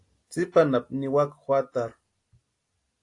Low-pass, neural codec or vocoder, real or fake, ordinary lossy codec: 10.8 kHz; none; real; AAC, 48 kbps